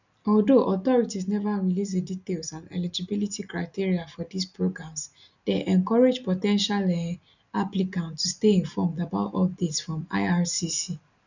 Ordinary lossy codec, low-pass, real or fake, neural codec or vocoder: none; 7.2 kHz; real; none